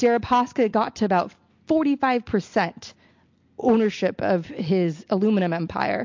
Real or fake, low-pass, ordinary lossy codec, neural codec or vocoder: real; 7.2 kHz; MP3, 48 kbps; none